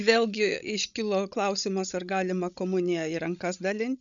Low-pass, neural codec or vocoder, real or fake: 7.2 kHz; codec, 16 kHz, 16 kbps, FreqCodec, larger model; fake